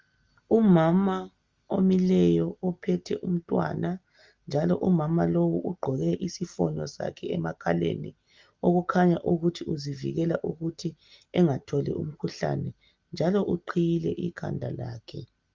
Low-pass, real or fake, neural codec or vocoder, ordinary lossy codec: 7.2 kHz; real; none; Opus, 32 kbps